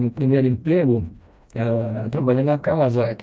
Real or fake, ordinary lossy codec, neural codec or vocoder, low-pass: fake; none; codec, 16 kHz, 1 kbps, FreqCodec, smaller model; none